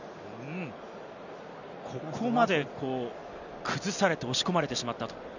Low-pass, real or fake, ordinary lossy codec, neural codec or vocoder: 7.2 kHz; real; none; none